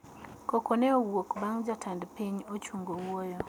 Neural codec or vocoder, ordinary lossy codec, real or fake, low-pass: none; none; real; 19.8 kHz